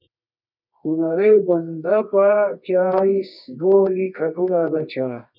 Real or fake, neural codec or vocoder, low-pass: fake; codec, 24 kHz, 0.9 kbps, WavTokenizer, medium music audio release; 5.4 kHz